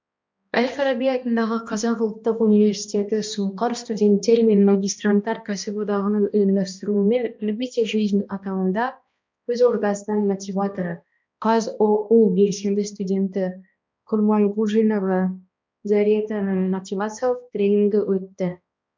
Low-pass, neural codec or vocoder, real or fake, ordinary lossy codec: 7.2 kHz; codec, 16 kHz, 1 kbps, X-Codec, HuBERT features, trained on balanced general audio; fake; MP3, 64 kbps